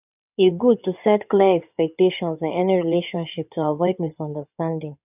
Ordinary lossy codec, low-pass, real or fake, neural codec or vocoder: none; 3.6 kHz; fake; codec, 16 kHz in and 24 kHz out, 2.2 kbps, FireRedTTS-2 codec